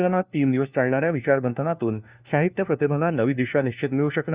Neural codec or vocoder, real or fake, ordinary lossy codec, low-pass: codec, 16 kHz, 1 kbps, FunCodec, trained on LibriTTS, 50 frames a second; fake; Opus, 64 kbps; 3.6 kHz